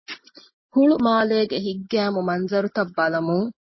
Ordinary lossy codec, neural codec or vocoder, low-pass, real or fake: MP3, 24 kbps; none; 7.2 kHz; real